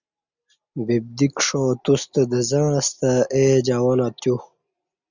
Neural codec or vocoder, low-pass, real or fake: none; 7.2 kHz; real